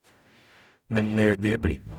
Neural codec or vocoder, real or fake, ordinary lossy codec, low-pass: codec, 44.1 kHz, 0.9 kbps, DAC; fake; none; 19.8 kHz